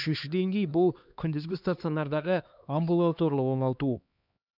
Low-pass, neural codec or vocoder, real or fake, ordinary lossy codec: 5.4 kHz; codec, 16 kHz, 2 kbps, X-Codec, HuBERT features, trained on LibriSpeech; fake; none